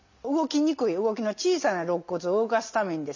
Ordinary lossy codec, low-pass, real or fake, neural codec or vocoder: none; 7.2 kHz; real; none